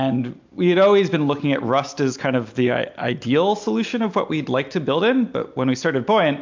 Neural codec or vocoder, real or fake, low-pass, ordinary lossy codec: none; real; 7.2 kHz; MP3, 64 kbps